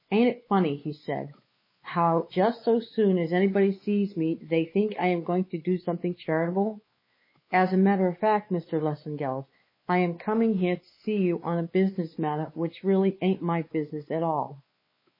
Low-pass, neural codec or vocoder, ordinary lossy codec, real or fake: 5.4 kHz; codec, 16 kHz, 4 kbps, X-Codec, WavLM features, trained on Multilingual LibriSpeech; MP3, 24 kbps; fake